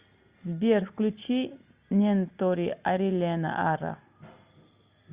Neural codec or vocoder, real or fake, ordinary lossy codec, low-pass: none; real; Opus, 64 kbps; 3.6 kHz